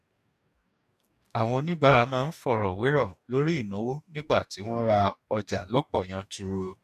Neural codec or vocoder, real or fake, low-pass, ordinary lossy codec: codec, 44.1 kHz, 2.6 kbps, DAC; fake; 14.4 kHz; none